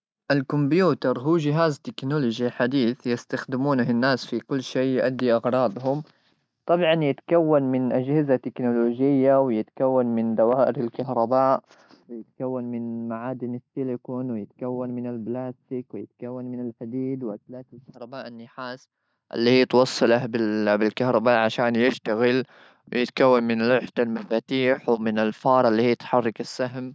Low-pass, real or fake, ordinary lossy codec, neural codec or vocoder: none; real; none; none